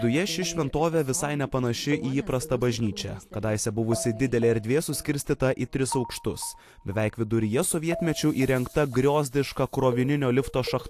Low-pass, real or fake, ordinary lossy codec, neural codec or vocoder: 14.4 kHz; real; AAC, 64 kbps; none